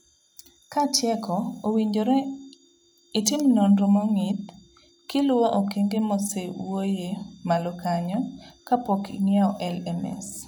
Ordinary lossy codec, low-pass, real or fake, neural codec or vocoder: none; none; real; none